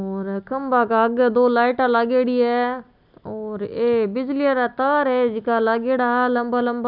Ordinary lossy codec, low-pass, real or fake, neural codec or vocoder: none; 5.4 kHz; real; none